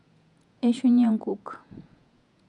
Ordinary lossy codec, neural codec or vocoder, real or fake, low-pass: none; vocoder, 48 kHz, 128 mel bands, Vocos; fake; 10.8 kHz